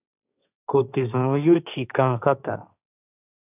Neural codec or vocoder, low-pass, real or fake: codec, 16 kHz, 1.1 kbps, Voila-Tokenizer; 3.6 kHz; fake